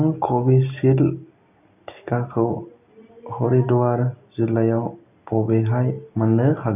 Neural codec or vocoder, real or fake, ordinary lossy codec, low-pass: none; real; none; 3.6 kHz